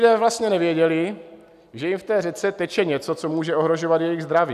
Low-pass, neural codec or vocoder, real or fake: 14.4 kHz; none; real